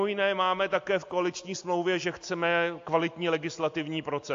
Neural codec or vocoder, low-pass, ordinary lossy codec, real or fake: none; 7.2 kHz; AAC, 48 kbps; real